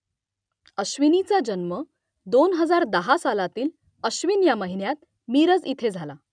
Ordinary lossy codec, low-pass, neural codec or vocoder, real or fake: none; 9.9 kHz; none; real